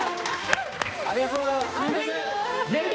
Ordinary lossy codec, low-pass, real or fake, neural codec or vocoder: none; none; fake; codec, 16 kHz, 2 kbps, X-Codec, HuBERT features, trained on balanced general audio